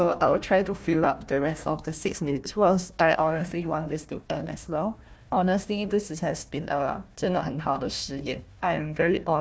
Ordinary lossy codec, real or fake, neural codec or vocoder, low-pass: none; fake; codec, 16 kHz, 1 kbps, FunCodec, trained on Chinese and English, 50 frames a second; none